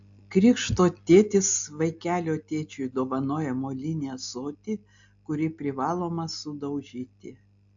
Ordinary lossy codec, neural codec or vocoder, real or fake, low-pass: AAC, 64 kbps; none; real; 7.2 kHz